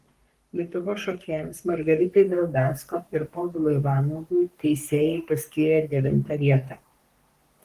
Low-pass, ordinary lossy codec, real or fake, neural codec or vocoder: 14.4 kHz; Opus, 24 kbps; fake; codec, 44.1 kHz, 3.4 kbps, Pupu-Codec